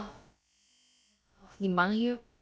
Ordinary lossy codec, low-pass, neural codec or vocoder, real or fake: none; none; codec, 16 kHz, about 1 kbps, DyCAST, with the encoder's durations; fake